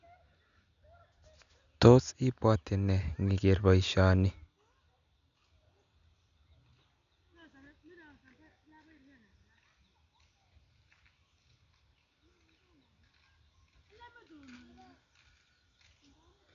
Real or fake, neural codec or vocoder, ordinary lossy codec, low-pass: real; none; none; 7.2 kHz